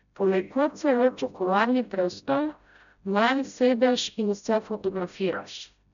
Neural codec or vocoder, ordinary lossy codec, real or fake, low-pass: codec, 16 kHz, 0.5 kbps, FreqCodec, smaller model; none; fake; 7.2 kHz